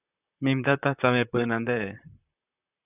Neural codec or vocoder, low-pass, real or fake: vocoder, 44.1 kHz, 128 mel bands, Pupu-Vocoder; 3.6 kHz; fake